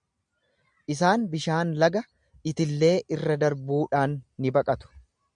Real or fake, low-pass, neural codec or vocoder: real; 9.9 kHz; none